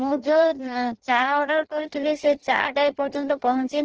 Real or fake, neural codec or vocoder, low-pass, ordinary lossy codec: fake; codec, 16 kHz in and 24 kHz out, 0.6 kbps, FireRedTTS-2 codec; 7.2 kHz; Opus, 16 kbps